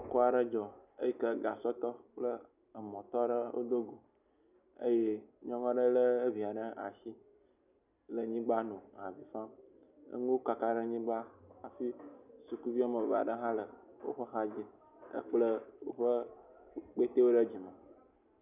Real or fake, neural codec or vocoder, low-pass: real; none; 3.6 kHz